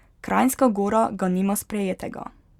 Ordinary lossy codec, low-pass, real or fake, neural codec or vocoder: Opus, 64 kbps; 19.8 kHz; real; none